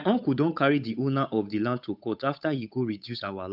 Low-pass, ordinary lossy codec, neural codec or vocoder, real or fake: 5.4 kHz; none; codec, 16 kHz, 8 kbps, FunCodec, trained on Chinese and English, 25 frames a second; fake